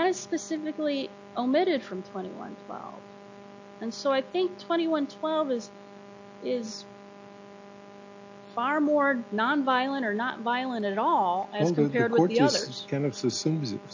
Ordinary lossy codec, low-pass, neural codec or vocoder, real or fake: AAC, 48 kbps; 7.2 kHz; none; real